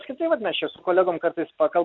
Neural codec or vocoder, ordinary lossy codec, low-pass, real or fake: none; MP3, 64 kbps; 7.2 kHz; real